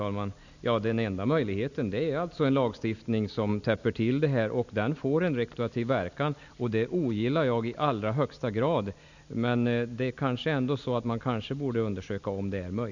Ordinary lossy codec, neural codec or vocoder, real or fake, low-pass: none; none; real; 7.2 kHz